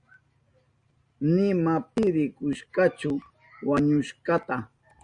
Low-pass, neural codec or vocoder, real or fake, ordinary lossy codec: 9.9 kHz; none; real; Opus, 64 kbps